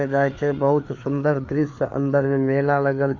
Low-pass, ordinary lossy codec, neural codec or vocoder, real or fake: 7.2 kHz; MP3, 64 kbps; codec, 16 kHz, 4 kbps, FreqCodec, larger model; fake